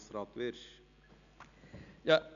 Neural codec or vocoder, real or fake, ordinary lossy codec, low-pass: none; real; none; 7.2 kHz